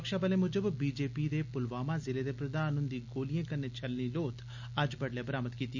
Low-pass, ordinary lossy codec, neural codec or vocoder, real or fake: 7.2 kHz; none; none; real